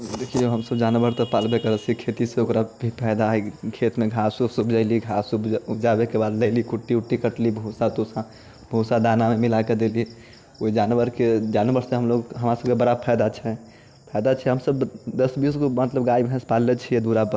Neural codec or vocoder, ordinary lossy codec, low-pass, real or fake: none; none; none; real